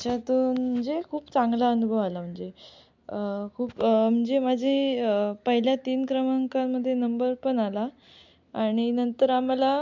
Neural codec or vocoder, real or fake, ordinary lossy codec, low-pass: none; real; MP3, 64 kbps; 7.2 kHz